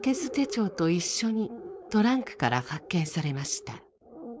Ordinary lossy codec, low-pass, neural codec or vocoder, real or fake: none; none; codec, 16 kHz, 4.8 kbps, FACodec; fake